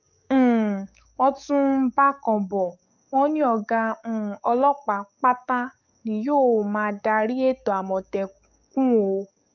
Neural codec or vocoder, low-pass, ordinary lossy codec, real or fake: codec, 44.1 kHz, 7.8 kbps, DAC; 7.2 kHz; none; fake